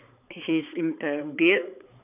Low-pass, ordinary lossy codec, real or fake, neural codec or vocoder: 3.6 kHz; none; fake; codec, 16 kHz, 4 kbps, X-Codec, HuBERT features, trained on balanced general audio